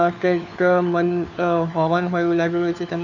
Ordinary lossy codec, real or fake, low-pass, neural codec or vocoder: none; fake; 7.2 kHz; codec, 16 kHz, 4 kbps, FunCodec, trained on LibriTTS, 50 frames a second